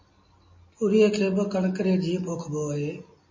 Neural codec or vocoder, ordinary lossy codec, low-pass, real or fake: none; MP3, 32 kbps; 7.2 kHz; real